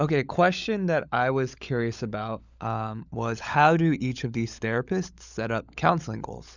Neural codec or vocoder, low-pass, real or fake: codec, 16 kHz, 16 kbps, FunCodec, trained on LibriTTS, 50 frames a second; 7.2 kHz; fake